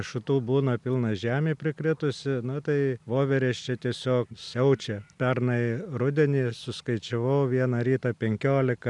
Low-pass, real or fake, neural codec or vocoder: 10.8 kHz; real; none